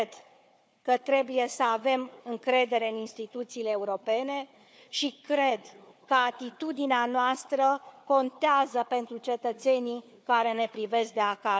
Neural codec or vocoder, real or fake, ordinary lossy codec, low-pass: codec, 16 kHz, 4 kbps, FunCodec, trained on Chinese and English, 50 frames a second; fake; none; none